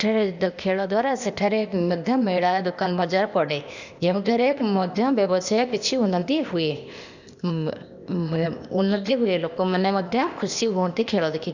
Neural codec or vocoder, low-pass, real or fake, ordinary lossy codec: codec, 16 kHz, 0.8 kbps, ZipCodec; 7.2 kHz; fake; none